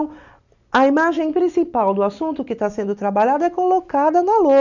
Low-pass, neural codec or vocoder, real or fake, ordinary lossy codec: 7.2 kHz; none; real; none